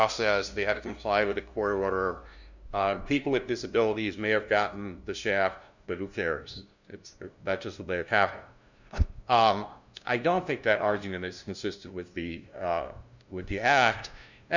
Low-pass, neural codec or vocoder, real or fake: 7.2 kHz; codec, 16 kHz, 0.5 kbps, FunCodec, trained on LibriTTS, 25 frames a second; fake